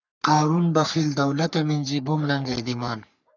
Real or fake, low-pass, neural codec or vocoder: fake; 7.2 kHz; codec, 44.1 kHz, 3.4 kbps, Pupu-Codec